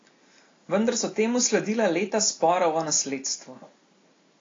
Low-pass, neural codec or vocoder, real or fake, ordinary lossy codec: 7.2 kHz; none; real; AAC, 32 kbps